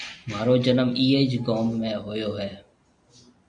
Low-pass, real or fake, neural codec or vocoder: 9.9 kHz; real; none